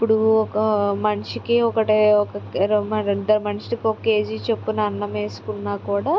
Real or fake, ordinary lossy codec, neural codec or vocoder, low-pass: real; none; none; none